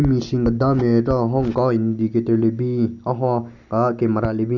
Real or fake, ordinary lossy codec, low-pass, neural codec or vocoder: real; none; 7.2 kHz; none